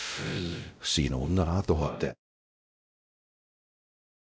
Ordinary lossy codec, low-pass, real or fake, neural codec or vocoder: none; none; fake; codec, 16 kHz, 0.5 kbps, X-Codec, WavLM features, trained on Multilingual LibriSpeech